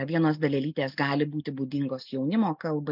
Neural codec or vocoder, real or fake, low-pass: none; real; 5.4 kHz